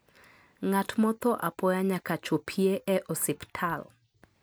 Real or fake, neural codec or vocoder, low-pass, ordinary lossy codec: real; none; none; none